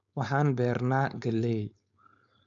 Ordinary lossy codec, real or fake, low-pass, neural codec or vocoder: none; fake; 7.2 kHz; codec, 16 kHz, 4.8 kbps, FACodec